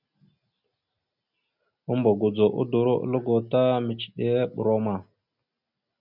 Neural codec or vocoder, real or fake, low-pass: none; real; 5.4 kHz